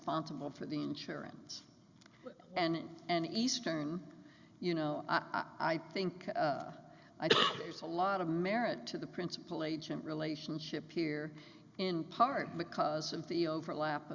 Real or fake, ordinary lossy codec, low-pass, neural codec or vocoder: real; Opus, 64 kbps; 7.2 kHz; none